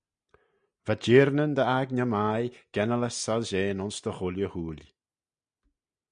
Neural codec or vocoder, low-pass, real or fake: none; 9.9 kHz; real